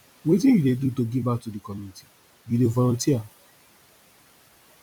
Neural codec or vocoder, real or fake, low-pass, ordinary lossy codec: vocoder, 44.1 kHz, 128 mel bands every 256 samples, BigVGAN v2; fake; 19.8 kHz; none